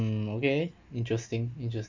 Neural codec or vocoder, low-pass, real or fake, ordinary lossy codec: none; 7.2 kHz; real; MP3, 64 kbps